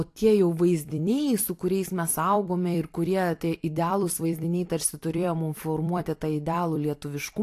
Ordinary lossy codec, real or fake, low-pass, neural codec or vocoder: AAC, 64 kbps; fake; 14.4 kHz; vocoder, 44.1 kHz, 128 mel bands every 256 samples, BigVGAN v2